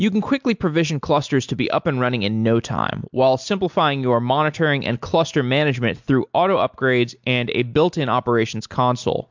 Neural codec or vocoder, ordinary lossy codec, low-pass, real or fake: none; MP3, 64 kbps; 7.2 kHz; real